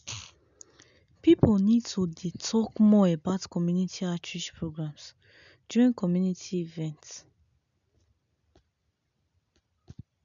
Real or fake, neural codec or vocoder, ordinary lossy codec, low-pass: real; none; none; 7.2 kHz